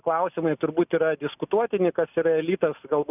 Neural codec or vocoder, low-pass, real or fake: none; 3.6 kHz; real